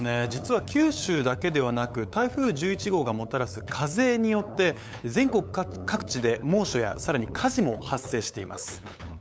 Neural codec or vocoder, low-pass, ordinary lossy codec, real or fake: codec, 16 kHz, 8 kbps, FunCodec, trained on LibriTTS, 25 frames a second; none; none; fake